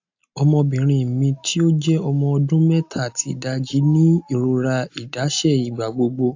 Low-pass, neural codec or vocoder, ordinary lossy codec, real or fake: 7.2 kHz; none; AAC, 48 kbps; real